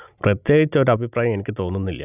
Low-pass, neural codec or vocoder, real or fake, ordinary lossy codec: 3.6 kHz; none; real; none